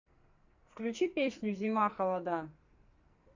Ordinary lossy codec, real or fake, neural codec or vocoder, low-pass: Opus, 64 kbps; fake; codec, 44.1 kHz, 2.6 kbps, SNAC; 7.2 kHz